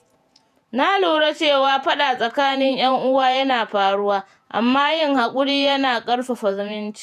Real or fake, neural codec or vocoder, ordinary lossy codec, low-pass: fake; vocoder, 48 kHz, 128 mel bands, Vocos; none; 14.4 kHz